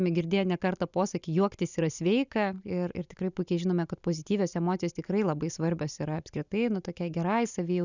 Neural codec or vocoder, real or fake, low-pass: none; real; 7.2 kHz